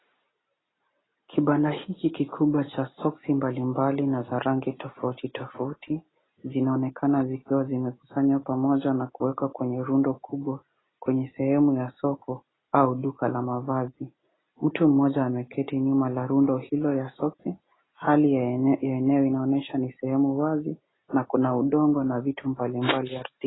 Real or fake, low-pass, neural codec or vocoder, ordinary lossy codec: real; 7.2 kHz; none; AAC, 16 kbps